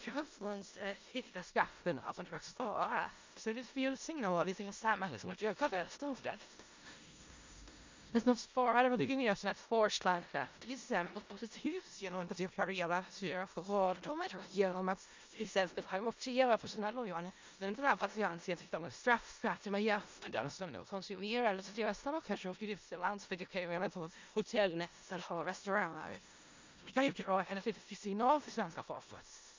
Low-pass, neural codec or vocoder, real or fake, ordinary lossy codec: 7.2 kHz; codec, 16 kHz in and 24 kHz out, 0.4 kbps, LongCat-Audio-Codec, four codebook decoder; fake; MP3, 64 kbps